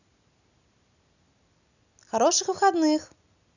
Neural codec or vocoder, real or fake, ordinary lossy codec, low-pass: none; real; none; 7.2 kHz